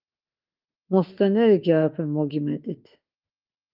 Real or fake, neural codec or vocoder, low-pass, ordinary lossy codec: fake; autoencoder, 48 kHz, 32 numbers a frame, DAC-VAE, trained on Japanese speech; 5.4 kHz; Opus, 24 kbps